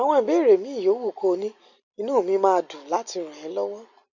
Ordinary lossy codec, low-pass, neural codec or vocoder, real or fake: none; 7.2 kHz; none; real